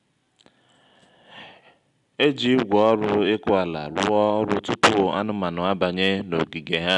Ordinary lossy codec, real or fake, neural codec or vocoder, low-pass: none; real; none; 10.8 kHz